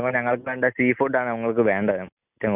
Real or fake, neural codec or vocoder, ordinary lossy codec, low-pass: real; none; none; 3.6 kHz